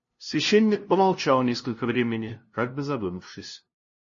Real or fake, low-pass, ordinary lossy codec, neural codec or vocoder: fake; 7.2 kHz; MP3, 32 kbps; codec, 16 kHz, 0.5 kbps, FunCodec, trained on LibriTTS, 25 frames a second